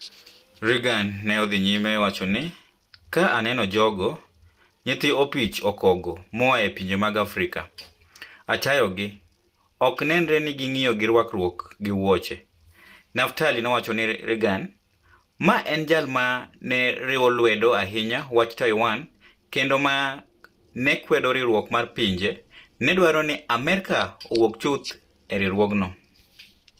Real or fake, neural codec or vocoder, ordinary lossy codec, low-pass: real; none; Opus, 24 kbps; 14.4 kHz